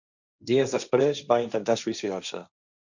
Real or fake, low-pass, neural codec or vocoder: fake; 7.2 kHz; codec, 16 kHz, 1.1 kbps, Voila-Tokenizer